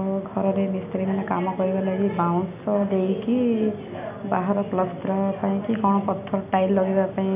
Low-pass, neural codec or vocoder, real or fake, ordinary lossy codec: 3.6 kHz; none; real; none